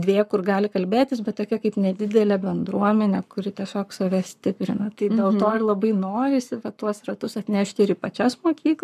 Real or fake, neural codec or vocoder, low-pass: fake; codec, 44.1 kHz, 7.8 kbps, Pupu-Codec; 14.4 kHz